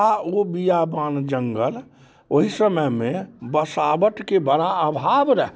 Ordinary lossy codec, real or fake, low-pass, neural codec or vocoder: none; real; none; none